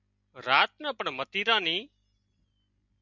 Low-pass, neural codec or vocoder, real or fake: 7.2 kHz; none; real